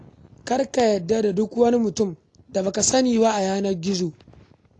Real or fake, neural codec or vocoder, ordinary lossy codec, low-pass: real; none; AAC, 48 kbps; 10.8 kHz